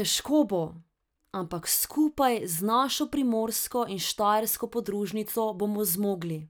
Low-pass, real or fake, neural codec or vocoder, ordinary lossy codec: none; real; none; none